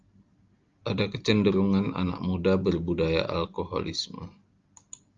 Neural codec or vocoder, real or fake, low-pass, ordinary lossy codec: none; real; 7.2 kHz; Opus, 32 kbps